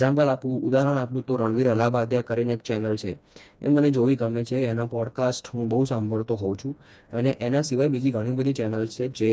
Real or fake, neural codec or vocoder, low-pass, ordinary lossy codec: fake; codec, 16 kHz, 2 kbps, FreqCodec, smaller model; none; none